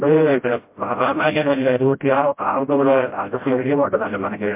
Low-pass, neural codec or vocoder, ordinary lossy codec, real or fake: 3.6 kHz; codec, 16 kHz, 0.5 kbps, FreqCodec, smaller model; MP3, 32 kbps; fake